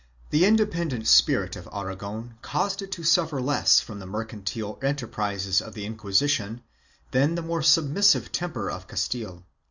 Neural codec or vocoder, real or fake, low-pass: none; real; 7.2 kHz